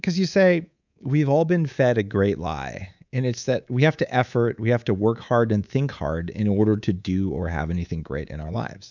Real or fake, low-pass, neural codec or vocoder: fake; 7.2 kHz; codec, 24 kHz, 3.1 kbps, DualCodec